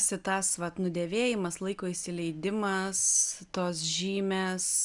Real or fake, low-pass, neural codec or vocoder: real; 10.8 kHz; none